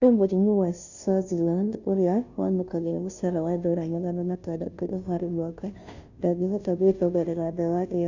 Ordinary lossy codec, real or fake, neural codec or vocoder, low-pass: none; fake; codec, 16 kHz, 0.5 kbps, FunCodec, trained on Chinese and English, 25 frames a second; 7.2 kHz